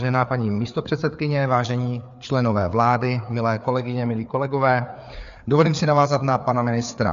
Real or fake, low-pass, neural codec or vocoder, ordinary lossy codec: fake; 7.2 kHz; codec, 16 kHz, 4 kbps, FreqCodec, larger model; MP3, 64 kbps